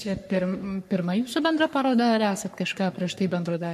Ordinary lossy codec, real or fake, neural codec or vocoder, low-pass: MP3, 64 kbps; fake; codec, 44.1 kHz, 3.4 kbps, Pupu-Codec; 14.4 kHz